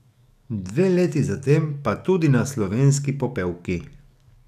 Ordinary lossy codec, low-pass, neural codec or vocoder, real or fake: none; 14.4 kHz; codec, 44.1 kHz, 7.8 kbps, DAC; fake